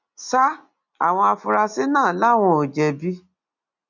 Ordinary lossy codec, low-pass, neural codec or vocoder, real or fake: none; 7.2 kHz; none; real